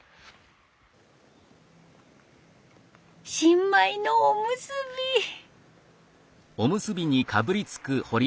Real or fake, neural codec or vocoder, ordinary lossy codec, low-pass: real; none; none; none